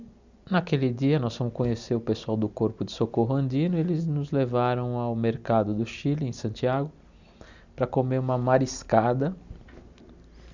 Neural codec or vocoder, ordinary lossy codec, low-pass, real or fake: none; Opus, 64 kbps; 7.2 kHz; real